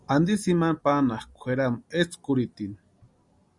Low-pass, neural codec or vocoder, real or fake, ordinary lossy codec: 10.8 kHz; none; real; Opus, 64 kbps